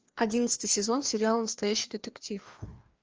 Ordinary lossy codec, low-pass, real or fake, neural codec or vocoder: Opus, 24 kbps; 7.2 kHz; fake; codec, 16 kHz, 2 kbps, FreqCodec, larger model